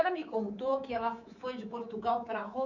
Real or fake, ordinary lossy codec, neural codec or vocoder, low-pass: fake; none; codec, 24 kHz, 3.1 kbps, DualCodec; 7.2 kHz